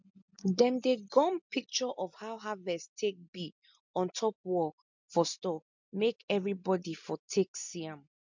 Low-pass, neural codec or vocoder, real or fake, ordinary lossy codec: 7.2 kHz; none; real; MP3, 64 kbps